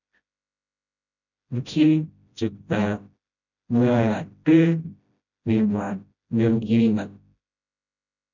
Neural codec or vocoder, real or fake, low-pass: codec, 16 kHz, 0.5 kbps, FreqCodec, smaller model; fake; 7.2 kHz